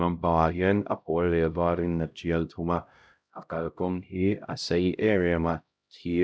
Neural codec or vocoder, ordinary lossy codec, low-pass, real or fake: codec, 16 kHz, 0.5 kbps, X-Codec, HuBERT features, trained on LibriSpeech; none; none; fake